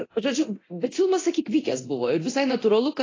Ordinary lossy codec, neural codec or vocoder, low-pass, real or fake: AAC, 32 kbps; codec, 24 kHz, 0.9 kbps, DualCodec; 7.2 kHz; fake